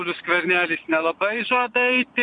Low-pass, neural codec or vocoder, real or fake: 9.9 kHz; vocoder, 22.05 kHz, 80 mel bands, Vocos; fake